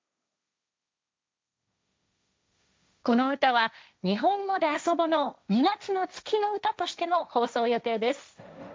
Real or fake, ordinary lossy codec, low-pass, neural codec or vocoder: fake; none; none; codec, 16 kHz, 1.1 kbps, Voila-Tokenizer